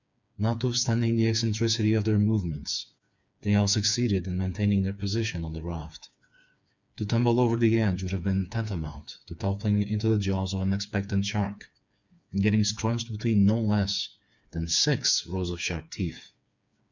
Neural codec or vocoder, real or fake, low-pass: codec, 16 kHz, 4 kbps, FreqCodec, smaller model; fake; 7.2 kHz